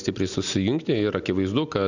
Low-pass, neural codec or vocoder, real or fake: 7.2 kHz; none; real